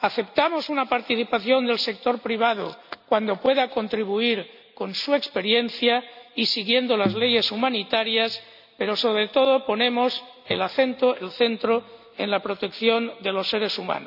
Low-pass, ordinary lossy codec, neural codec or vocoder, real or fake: 5.4 kHz; none; none; real